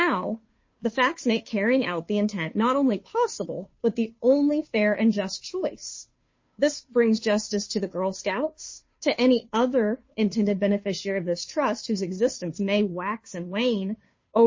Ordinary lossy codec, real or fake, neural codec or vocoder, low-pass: MP3, 32 kbps; fake; codec, 16 kHz, 2 kbps, FunCodec, trained on Chinese and English, 25 frames a second; 7.2 kHz